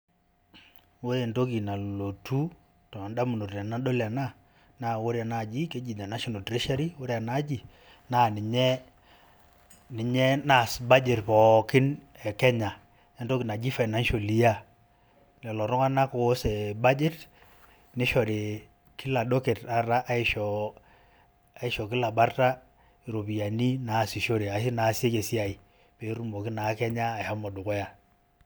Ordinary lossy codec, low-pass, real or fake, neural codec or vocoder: none; none; real; none